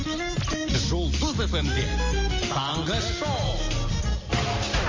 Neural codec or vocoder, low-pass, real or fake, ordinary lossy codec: none; 7.2 kHz; real; MP3, 32 kbps